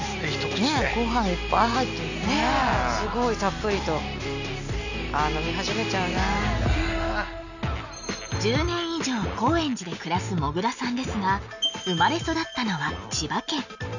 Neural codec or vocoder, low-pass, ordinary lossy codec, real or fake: none; 7.2 kHz; none; real